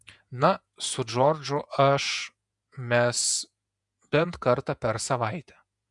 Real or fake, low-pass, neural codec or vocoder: fake; 10.8 kHz; vocoder, 48 kHz, 128 mel bands, Vocos